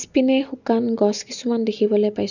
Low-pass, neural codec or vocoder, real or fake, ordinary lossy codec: 7.2 kHz; none; real; none